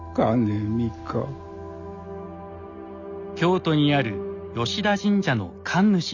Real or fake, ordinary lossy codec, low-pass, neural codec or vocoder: real; Opus, 64 kbps; 7.2 kHz; none